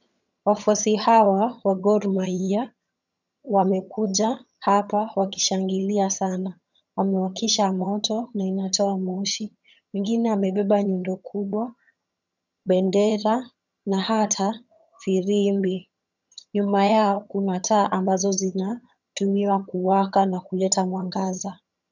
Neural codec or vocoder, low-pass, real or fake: vocoder, 22.05 kHz, 80 mel bands, HiFi-GAN; 7.2 kHz; fake